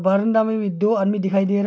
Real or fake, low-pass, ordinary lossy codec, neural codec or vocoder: real; none; none; none